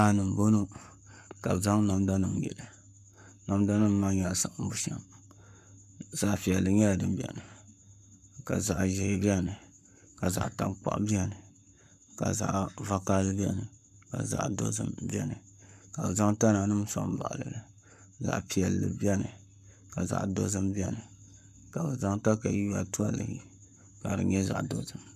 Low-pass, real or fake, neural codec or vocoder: 14.4 kHz; fake; codec, 44.1 kHz, 7.8 kbps, Pupu-Codec